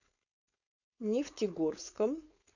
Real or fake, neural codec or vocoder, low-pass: fake; codec, 16 kHz, 4.8 kbps, FACodec; 7.2 kHz